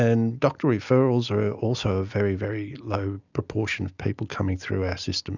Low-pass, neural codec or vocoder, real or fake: 7.2 kHz; none; real